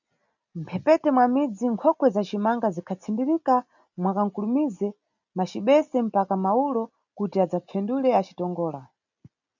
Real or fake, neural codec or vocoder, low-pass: real; none; 7.2 kHz